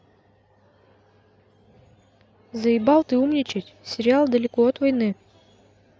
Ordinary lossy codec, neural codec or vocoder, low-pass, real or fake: none; none; none; real